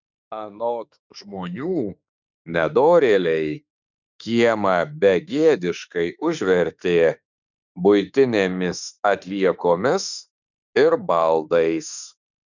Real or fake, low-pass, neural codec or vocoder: fake; 7.2 kHz; autoencoder, 48 kHz, 32 numbers a frame, DAC-VAE, trained on Japanese speech